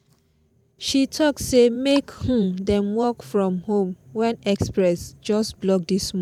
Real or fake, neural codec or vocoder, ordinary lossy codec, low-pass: fake; vocoder, 44.1 kHz, 128 mel bands every 512 samples, BigVGAN v2; none; 19.8 kHz